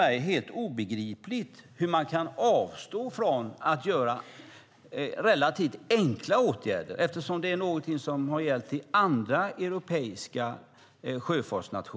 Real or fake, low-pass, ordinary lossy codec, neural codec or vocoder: real; none; none; none